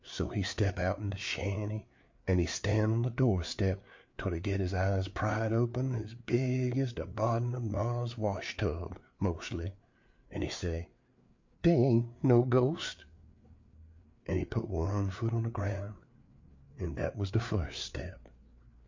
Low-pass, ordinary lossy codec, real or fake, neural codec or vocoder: 7.2 kHz; MP3, 48 kbps; fake; codec, 16 kHz, 4 kbps, FreqCodec, larger model